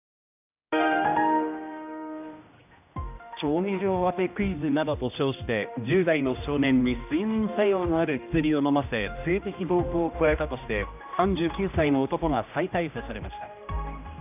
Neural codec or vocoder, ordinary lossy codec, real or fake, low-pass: codec, 16 kHz, 1 kbps, X-Codec, HuBERT features, trained on general audio; none; fake; 3.6 kHz